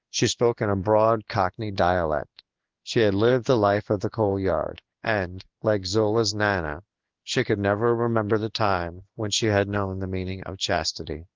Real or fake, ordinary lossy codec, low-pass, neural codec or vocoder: fake; Opus, 24 kbps; 7.2 kHz; codec, 16 kHz in and 24 kHz out, 1 kbps, XY-Tokenizer